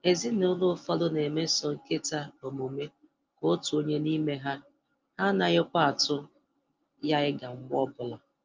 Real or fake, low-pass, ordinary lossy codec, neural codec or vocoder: real; 7.2 kHz; Opus, 24 kbps; none